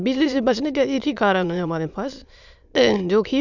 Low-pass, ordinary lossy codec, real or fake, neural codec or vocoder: 7.2 kHz; none; fake; autoencoder, 22.05 kHz, a latent of 192 numbers a frame, VITS, trained on many speakers